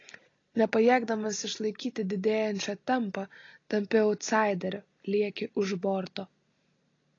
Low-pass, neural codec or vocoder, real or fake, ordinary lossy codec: 7.2 kHz; none; real; AAC, 32 kbps